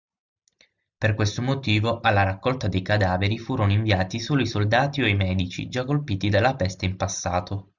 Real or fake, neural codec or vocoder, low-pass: real; none; 7.2 kHz